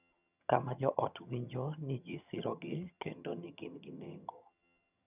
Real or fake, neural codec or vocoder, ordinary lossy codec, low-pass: fake; vocoder, 22.05 kHz, 80 mel bands, HiFi-GAN; none; 3.6 kHz